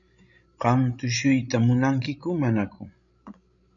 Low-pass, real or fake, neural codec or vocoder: 7.2 kHz; fake; codec, 16 kHz, 16 kbps, FreqCodec, larger model